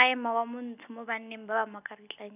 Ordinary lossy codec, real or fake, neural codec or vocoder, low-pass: none; real; none; 3.6 kHz